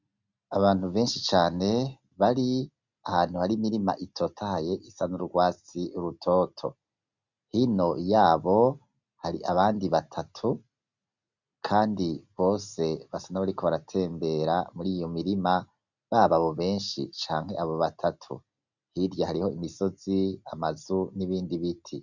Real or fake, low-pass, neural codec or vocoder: real; 7.2 kHz; none